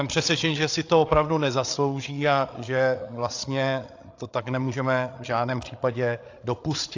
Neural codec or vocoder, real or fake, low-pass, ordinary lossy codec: codec, 16 kHz, 8 kbps, FreqCodec, larger model; fake; 7.2 kHz; AAC, 48 kbps